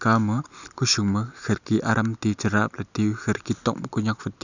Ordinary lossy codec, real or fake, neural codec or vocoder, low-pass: none; real; none; 7.2 kHz